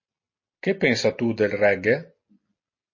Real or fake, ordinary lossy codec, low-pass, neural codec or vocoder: real; MP3, 32 kbps; 7.2 kHz; none